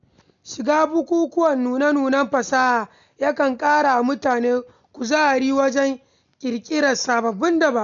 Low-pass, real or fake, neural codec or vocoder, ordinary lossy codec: 7.2 kHz; real; none; none